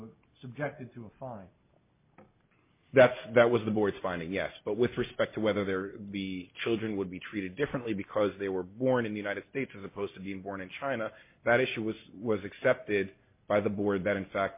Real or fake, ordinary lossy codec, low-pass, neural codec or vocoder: real; MP3, 24 kbps; 3.6 kHz; none